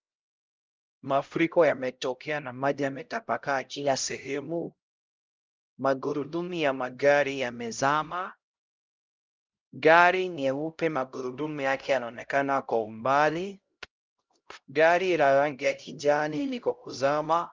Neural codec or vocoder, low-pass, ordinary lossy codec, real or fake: codec, 16 kHz, 0.5 kbps, X-Codec, HuBERT features, trained on LibriSpeech; 7.2 kHz; Opus, 24 kbps; fake